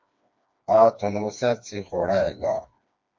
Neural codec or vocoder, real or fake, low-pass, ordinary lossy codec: codec, 16 kHz, 2 kbps, FreqCodec, smaller model; fake; 7.2 kHz; MP3, 48 kbps